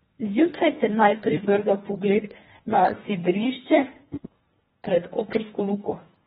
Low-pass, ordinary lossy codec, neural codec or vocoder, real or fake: 10.8 kHz; AAC, 16 kbps; codec, 24 kHz, 1.5 kbps, HILCodec; fake